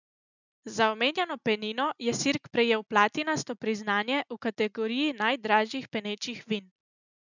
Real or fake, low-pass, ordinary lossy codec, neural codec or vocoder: real; 7.2 kHz; none; none